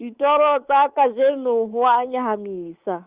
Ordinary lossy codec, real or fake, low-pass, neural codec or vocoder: Opus, 32 kbps; fake; 3.6 kHz; codec, 24 kHz, 3.1 kbps, DualCodec